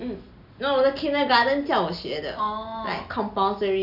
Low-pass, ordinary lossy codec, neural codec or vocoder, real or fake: 5.4 kHz; none; none; real